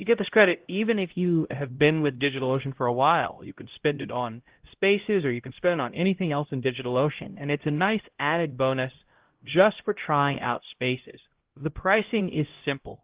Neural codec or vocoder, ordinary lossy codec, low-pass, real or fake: codec, 16 kHz, 0.5 kbps, X-Codec, HuBERT features, trained on LibriSpeech; Opus, 16 kbps; 3.6 kHz; fake